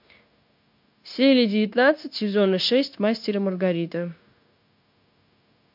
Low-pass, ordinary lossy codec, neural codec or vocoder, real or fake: 5.4 kHz; MP3, 48 kbps; codec, 16 kHz, 0.9 kbps, LongCat-Audio-Codec; fake